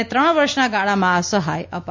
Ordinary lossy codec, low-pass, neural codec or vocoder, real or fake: MP3, 48 kbps; 7.2 kHz; none; real